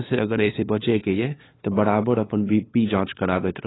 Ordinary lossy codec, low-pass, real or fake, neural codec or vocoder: AAC, 16 kbps; 7.2 kHz; fake; codec, 16 kHz, 2 kbps, FunCodec, trained on LibriTTS, 25 frames a second